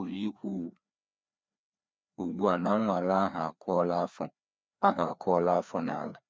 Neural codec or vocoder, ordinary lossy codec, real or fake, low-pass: codec, 16 kHz, 2 kbps, FreqCodec, larger model; none; fake; none